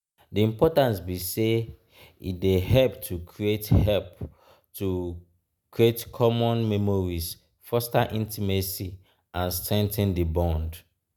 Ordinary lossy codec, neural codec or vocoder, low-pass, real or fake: none; none; none; real